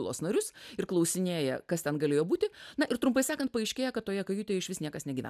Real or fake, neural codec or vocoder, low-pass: real; none; 14.4 kHz